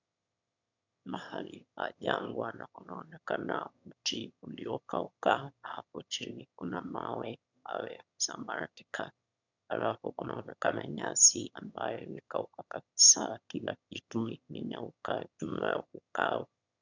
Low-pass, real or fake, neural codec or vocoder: 7.2 kHz; fake; autoencoder, 22.05 kHz, a latent of 192 numbers a frame, VITS, trained on one speaker